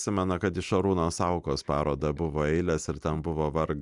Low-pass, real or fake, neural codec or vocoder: 10.8 kHz; real; none